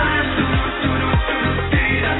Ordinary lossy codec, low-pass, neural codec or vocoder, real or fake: AAC, 16 kbps; 7.2 kHz; none; real